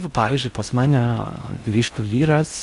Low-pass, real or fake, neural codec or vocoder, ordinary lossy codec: 10.8 kHz; fake; codec, 16 kHz in and 24 kHz out, 0.6 kbps, FocalCodec, streaming, 4096 codes; Opus, 64 kbps